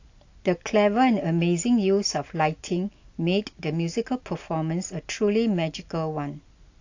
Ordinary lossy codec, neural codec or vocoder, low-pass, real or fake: AAC, 48 kbps; none; 7.2 kHz; real